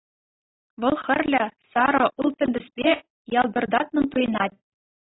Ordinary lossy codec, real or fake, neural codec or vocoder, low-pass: AAC, 16 kbps; real; none; 7.2 kHz